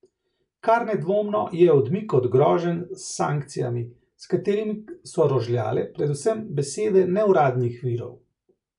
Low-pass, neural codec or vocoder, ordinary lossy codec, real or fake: 10.8 kHz; none; none; real